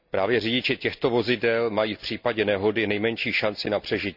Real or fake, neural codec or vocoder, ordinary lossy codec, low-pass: real; none; none; 5.4 kHz